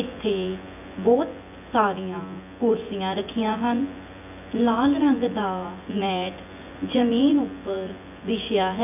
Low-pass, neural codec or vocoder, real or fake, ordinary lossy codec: 3.6 kHz; vocoder, 24 kHz, 100 mel bands, Vocos; fake; none